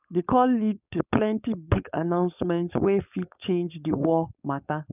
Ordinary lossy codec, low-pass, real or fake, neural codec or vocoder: none; 3.6 kHz; fake; codec, 16 kHz, 4.8 kbps, FACodec